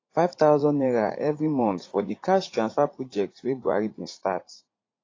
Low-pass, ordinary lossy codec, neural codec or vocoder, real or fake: 7.2 kHz; AAC, 32 kbps; vocoder, 44.1 kHz, 80 mel bands, Vocos; fake